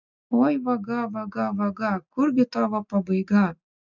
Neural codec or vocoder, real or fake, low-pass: none; real; 7.2 kHz